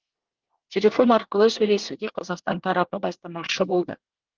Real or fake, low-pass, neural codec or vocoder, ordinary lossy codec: fake; 7.2 kHz; codec, 24 kHz, 1 kbps, SNAC; Opus, 16 kbps